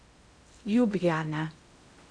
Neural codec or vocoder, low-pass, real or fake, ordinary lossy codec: codec, 16 kHz in and 24 kHz out, 0.6 kbps, FocalCodec, streaming, 2048 codes; 9.9 kHz; fake; Opus, 64 kbps